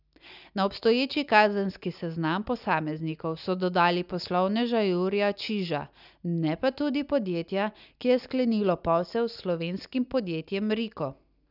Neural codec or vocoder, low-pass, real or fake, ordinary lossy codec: none; 5.4 kHz; real; none